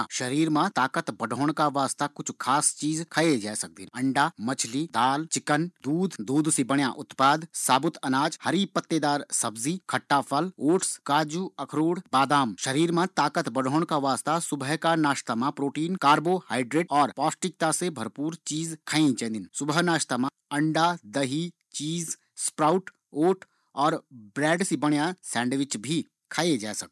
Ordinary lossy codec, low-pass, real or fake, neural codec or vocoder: none; none; real; none